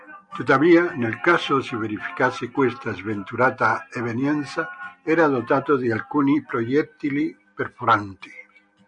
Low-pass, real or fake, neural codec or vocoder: 9.9 kHz; real; none